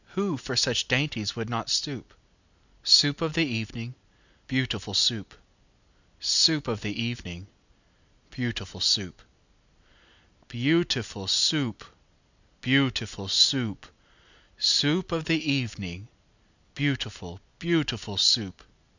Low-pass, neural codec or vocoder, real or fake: 7.2 kHz; none; real